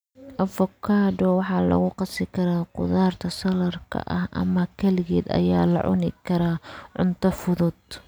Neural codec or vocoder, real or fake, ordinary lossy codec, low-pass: none; real; none; none